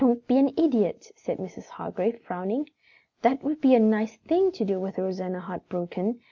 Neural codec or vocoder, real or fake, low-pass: none; real; 7.2 kHz